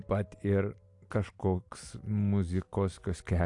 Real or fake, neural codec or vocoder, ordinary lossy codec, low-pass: real; none; AAC, 64 kbps; 10.8 kHz